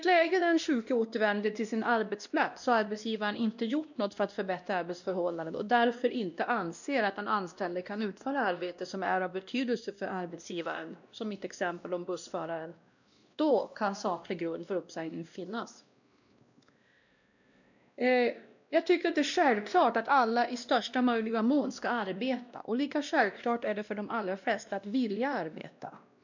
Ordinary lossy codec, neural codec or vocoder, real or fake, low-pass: none; codec, 16 kHz, 1 kbps, X-Codec, WavLM features, trained on Multilingual LibriSpeech; fake; 7.2 kHz